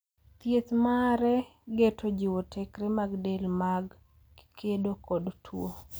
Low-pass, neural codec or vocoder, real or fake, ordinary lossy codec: none; none; real; none